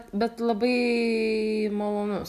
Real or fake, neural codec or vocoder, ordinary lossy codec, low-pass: real; none; AAC, 96 kbps; 14.4 kHz